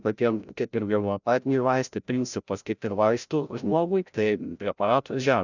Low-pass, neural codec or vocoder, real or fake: 7.2 kHz; codec, 16 kHz, 0.5 kbps, FreqCodec, larger model; fake